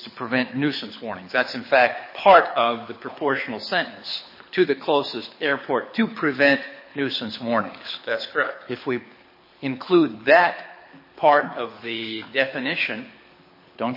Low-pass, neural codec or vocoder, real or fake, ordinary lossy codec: 5.4 kHz; codec, 24 kHz, 6 kbps, HILCodec; fake; MP3, 24 kbps